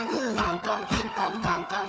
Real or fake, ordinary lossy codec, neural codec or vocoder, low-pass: fake; none; codec, 16 kHz, 4 kbps, FunCodec, trained on Chinese and English, 50 frames a second; none